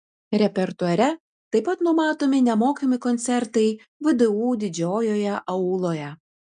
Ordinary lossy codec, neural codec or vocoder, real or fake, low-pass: MP3, 96 kbps; none; real; 9.9 kHz